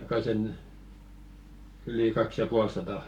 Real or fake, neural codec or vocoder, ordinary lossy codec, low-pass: fake; codec, 44.1 kHz, 7.8 kbps, Pupu-Codec; none; 19.8 kHz